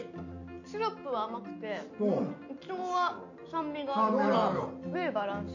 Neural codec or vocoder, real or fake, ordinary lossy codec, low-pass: none; real; none; 7.2 kHz